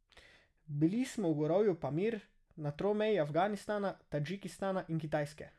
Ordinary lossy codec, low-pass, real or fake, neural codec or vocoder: none; none; real; none